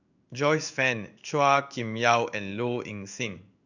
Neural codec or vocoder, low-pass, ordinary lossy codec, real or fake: codec, 16 kHz in and 24 kHz out, 1 kbps, XY-Tokenizer; 7.2 kHz; none; fake